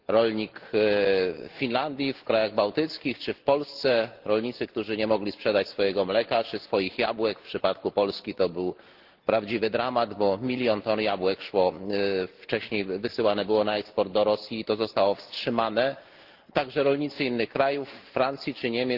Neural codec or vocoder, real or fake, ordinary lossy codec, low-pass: none; real; Opus, 16 kbps; 5.4 kHz